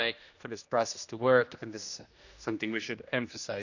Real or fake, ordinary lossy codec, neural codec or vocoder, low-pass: fake; none; codec, 16 kHz, 1 kbps, X-Codec, HuBERT features, trained on general audio; 7.2 kHz